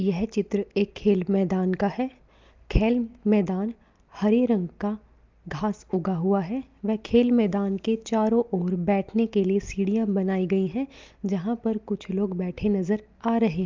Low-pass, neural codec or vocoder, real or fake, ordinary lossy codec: 7.2 kHz; none; real; Opus, 24 kbps